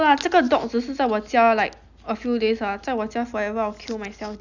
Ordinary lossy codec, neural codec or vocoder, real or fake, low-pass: none; none; real; 7.2 kHz